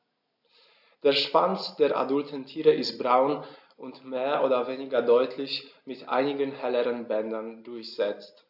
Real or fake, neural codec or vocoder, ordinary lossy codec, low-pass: real; none; AAC, 48 kbps; 5.4 kHz